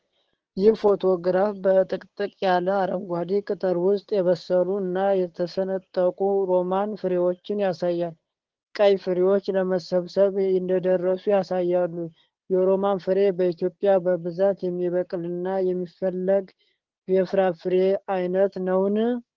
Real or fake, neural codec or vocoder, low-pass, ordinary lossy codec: fake; codec, 16 kHz, 6 kbps, DAC; 7.2 kHz; Opus, 16 kbps